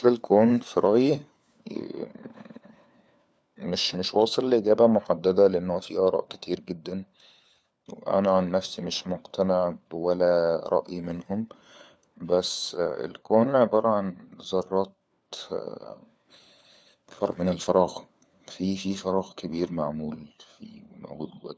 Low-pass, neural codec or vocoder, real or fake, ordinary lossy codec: none; codec, 16 kHz, 4 kbps, FunCodec, trained on LibriTTS, 50 frames a second; fake; none